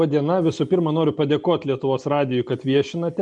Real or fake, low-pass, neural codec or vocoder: real; 10.8 kHz; none